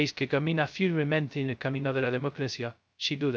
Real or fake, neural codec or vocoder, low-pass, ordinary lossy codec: fake; codec, 16 kHz, 0.2 kbps, FocalCodec; none; none